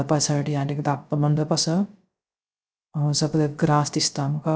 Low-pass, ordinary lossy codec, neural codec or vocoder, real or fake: none; none; codec, 16 kHz, 0.3 kbps, FocalCodec; fake